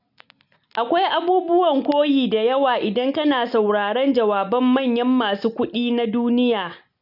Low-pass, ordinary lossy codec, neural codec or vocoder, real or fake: 5.4 kHz; none; none; real